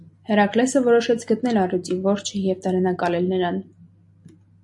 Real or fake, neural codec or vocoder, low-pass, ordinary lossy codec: real; none; 10.8 kHz; MP3, 48 kbps